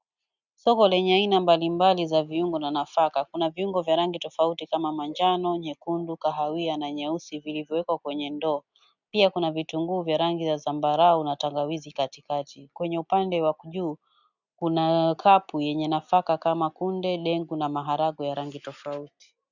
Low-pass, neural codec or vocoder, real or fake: 7.2 kHz; none; real